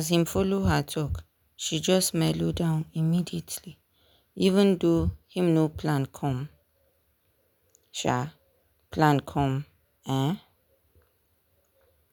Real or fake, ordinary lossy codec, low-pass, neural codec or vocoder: real; none; 19.8 kHz; none